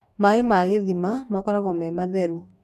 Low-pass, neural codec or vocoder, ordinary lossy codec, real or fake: 14.4 kHz; codec, 44.1 kHz, 2.6 kbps, DAC; none; fake